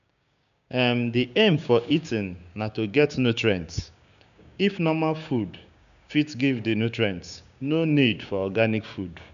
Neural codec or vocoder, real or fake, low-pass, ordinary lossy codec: codec, 16 kHz, 6 kbps, DAC; fake; 7.2 kHz; none